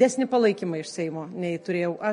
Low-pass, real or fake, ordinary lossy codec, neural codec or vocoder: 19.8 kHz; real; MP3, 48 kbps; none